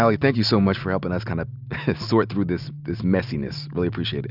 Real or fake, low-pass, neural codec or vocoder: real; 5.4 kHz; none